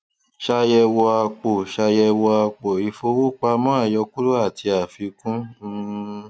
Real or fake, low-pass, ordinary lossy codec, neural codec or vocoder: real; none; none; none